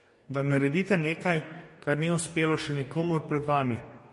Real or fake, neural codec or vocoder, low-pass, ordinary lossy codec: fake; codec, 44.1 kHz, 2.6 kbps, DAC; 14.4 kHz; MP3, 48 kbps